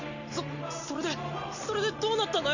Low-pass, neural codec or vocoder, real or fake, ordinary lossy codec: 7.2 kHz; none; real; none